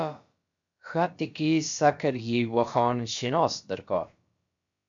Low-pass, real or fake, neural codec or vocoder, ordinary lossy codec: 7.2 kHz; fake; codec, 16 kHz, about 1 kbps, DyCAST, with the encoder's durations; MP3, 64 kbps